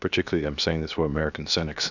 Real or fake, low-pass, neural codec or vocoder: fake; 7.2 kHz; codec, 16 kHz, 0.7 kbps, FocalCodec